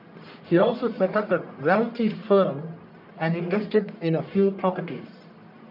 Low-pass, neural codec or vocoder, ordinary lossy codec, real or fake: 5.4 kHz; codec, 44.1 kHz, 1.7 kbps, Pupu-Codec; none; fake